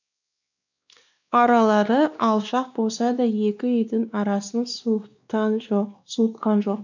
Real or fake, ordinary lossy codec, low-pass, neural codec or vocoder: fake; none; 7.2 kHz; codec, 16 kHz, 2 kbps, X-Codec, WavLM features, trained on Multilingual LibriSpeech